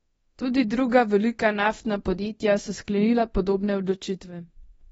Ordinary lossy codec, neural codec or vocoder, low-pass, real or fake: AAC, 24 kbps; codec, 24 kHz, 0.9 kbps, DualCodec; 10.8 kHz; fake